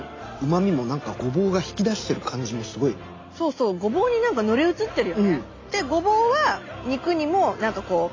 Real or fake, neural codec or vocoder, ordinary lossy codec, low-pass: real; none; AAC, 32 kbps; 7.2 kHz